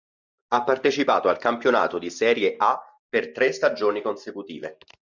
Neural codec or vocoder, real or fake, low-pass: none; real; 7.2 kHz